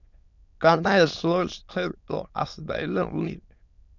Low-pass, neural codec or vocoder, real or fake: 7.2 kHz; autoencoder, 22.05 kHz, a latent of 192 numbers a frame, VITS, trained on many speakers; fake